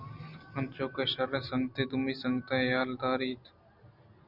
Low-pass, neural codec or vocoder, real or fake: 5.4 kHz; none; real